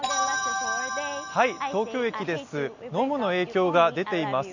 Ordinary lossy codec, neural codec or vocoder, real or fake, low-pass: none; none; real; 7.2 kHz